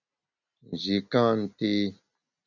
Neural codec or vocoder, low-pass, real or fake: none; 7.2 kHz; real